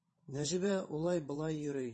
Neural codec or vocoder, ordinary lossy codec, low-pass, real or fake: vocoder, 22.05 kHz, 80 mel bands, WaveNeXt; MP3, 32 kbps; 9.9 kHz; fake